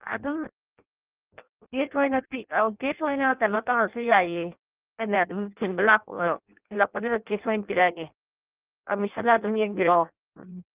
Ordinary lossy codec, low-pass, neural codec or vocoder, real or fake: Opus, 24 kbps; 3.6 kHz; codec, 16 kHz in and 24 kHz out, 0.6 kbps, FireRedTTS-2 codec; fake